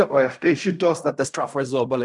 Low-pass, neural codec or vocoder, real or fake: 10.8 kHz; codec, 16 kHz in and 24 kHz out, 0.4 kbps, LongCat-Audio-Codec, fine tuned four codebook decoder; fake